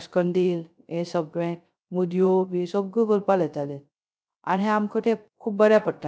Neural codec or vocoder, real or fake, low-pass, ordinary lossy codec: codec, 16 kHz, 0.3 kbps, FocalCodec; fake; none; none